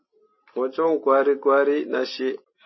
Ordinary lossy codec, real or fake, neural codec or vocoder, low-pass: MP3, 24 kbps; real; none; 7.2 kHz